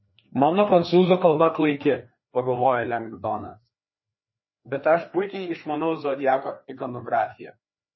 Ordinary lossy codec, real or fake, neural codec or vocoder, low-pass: MP3, 24 kbps; fake; codec, 16 kHz, 2 kbps, FreqCodec, larger model; 7.2 kHz